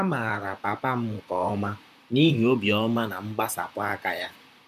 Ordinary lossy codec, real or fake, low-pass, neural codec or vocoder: none; fake; 14.4 kHz; vocoder, 44.1 kHz, 128 mel bands, Pupu-Vocoder